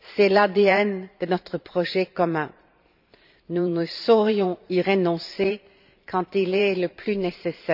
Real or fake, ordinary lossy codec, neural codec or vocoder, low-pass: fake; none; vocoder, 44.1 kHz, 128 mel bands every 512 samples, BigVGAN v2; 5.4 kHz